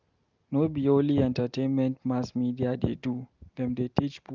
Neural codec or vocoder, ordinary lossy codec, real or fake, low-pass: none; Opus, 24 kbps; real; 7.2 kHz